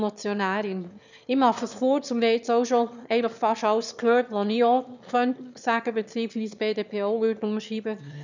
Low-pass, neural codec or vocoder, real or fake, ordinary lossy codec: 7.2 kHz; autoencoder, 22.05 kHz, a latent of 192 numbers a frame, VITS, trained on one speaker; fake; none